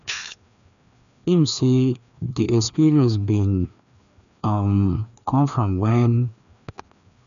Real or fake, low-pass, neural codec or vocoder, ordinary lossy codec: fake; 7.2 kHz; codec, 16 kHz, 2 kbps, FreqCodec, larger model; none